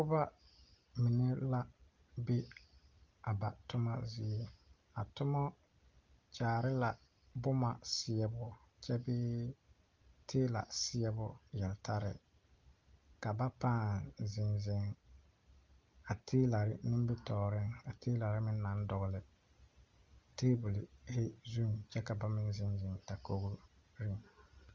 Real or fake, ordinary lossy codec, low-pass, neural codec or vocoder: real; Opus, 32 kbps; 7.2 kHz; none